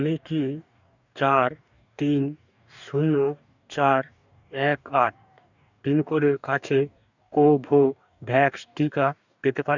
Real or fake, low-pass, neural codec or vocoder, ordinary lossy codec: fake; 7.2 kHz; codec, 44.1 kHz, 2.6 kbps, DAC; none